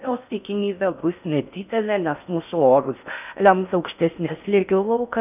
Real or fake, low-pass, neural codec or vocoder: fake; 3.6 kHz; codec, 16 kHz in and 24 kHz out, 0.6 kbps, FocalCodec, streaming, 4096 codes